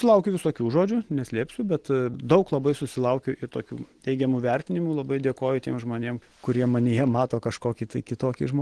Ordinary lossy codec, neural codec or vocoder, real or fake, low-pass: Opus, 16 kbps; none; real; 10.8 kHz